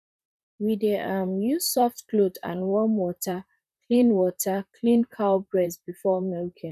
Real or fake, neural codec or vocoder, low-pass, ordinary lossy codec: fake; vocoder, 44.1 kHz, 128 mel bands, Pupu-Vocoder; 14.4 kHz; none